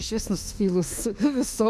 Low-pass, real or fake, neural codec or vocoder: 14.4 kHz; fake; autoencoder, 48 kHz, 32 numbers a frame, DAC-VAE, trained on Japanese speech